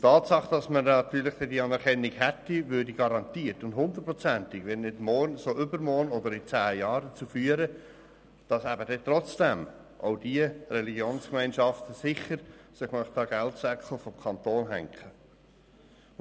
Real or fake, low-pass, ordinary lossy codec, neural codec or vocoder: real; none; none; none